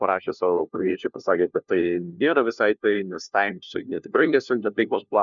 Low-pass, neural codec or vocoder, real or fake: 7.2 kHz; codec, 16 kHz, 1 kbps, FunCodec, trained on LibriTTS, 50 frames a second; fake